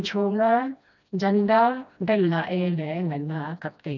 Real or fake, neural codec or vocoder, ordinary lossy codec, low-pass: fake; codec, 16 kHz, 1 kbps, FreqCodec, smaller model; none; 7.2 kHz